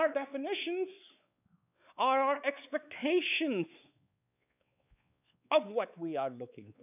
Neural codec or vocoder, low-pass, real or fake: codec, 16 kHz, 4 kbps, X-Codec, WavLM features, trained on Multilingual LibriSpeech; 3.6 kHz; fake